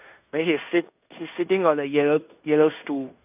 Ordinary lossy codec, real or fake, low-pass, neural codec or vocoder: none; fake; 3.6 kHz; codec, 16 kHz in and 24 kHz out, 0.9 kbps, LongCat-Audio-Codec, fine tuned four codebook decoder